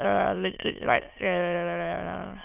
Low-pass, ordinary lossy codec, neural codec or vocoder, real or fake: 3.6 kHz; none; autoencoder, 22.05 kHz, a latent of 192 numbers a frame, VITS, trained on many speakers; fake